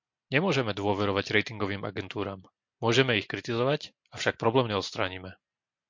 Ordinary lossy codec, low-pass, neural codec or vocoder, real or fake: MP3, 48 kbps; 7.2 kHz; none; real